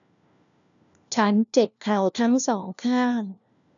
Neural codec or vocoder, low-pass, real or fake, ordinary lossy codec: codec, 16 kHz, 1 kbps, FunCodec, trained on LibriTTS, 50 frames a second; 7.2 kHz; fake; none